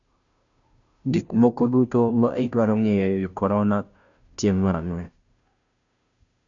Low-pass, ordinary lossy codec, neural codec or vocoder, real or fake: 7.2 kHz; none; codec, 16 kHz, 0.5 kbps, FunCodec, trained on Chinese and English, 25 frames a second; fake